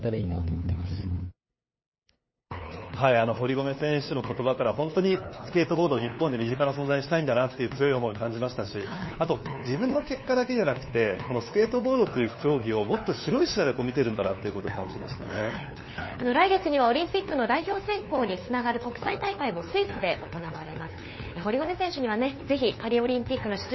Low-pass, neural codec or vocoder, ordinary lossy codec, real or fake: 7.2 kHz; codec, 16 kHz, 2 kbps, FunCodec, trained on LibriTTS, 25 frames a second; MP3, 24 kbps; fake